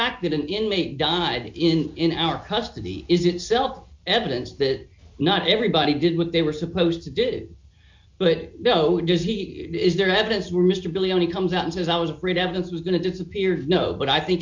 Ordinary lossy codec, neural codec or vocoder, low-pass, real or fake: MP3, 48 kbps; none; 7.2 kHz; real